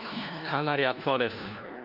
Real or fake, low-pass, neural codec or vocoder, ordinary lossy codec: fake; 5.4 kHz; codec, 16 kHz, 1 kbps, FunCodec, trained on LibriTTS, 50 frames a second; none